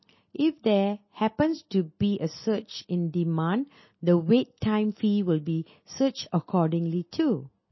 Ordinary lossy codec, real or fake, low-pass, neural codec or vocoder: MP3, 24 kbps; real; 7.2 kHz; none